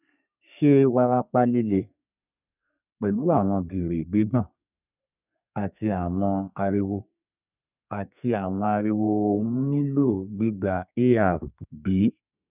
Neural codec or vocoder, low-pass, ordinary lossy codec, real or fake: codec, 32 kHz, 1.9 kbps, SNAC; 3.6 kHz; none; fake